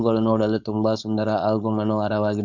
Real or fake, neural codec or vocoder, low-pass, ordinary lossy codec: fake; codec, 16 kHz, 4.8 kbps, FACodec; 7.2 kHz; none